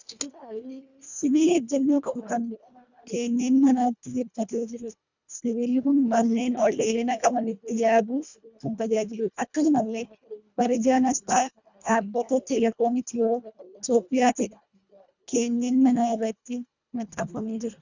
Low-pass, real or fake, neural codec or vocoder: 7.2 kHz; fake; codec, 24 kHz, 1.5 kbps, HILCodec